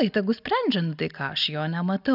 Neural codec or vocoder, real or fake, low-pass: none; real; 5.4 kHz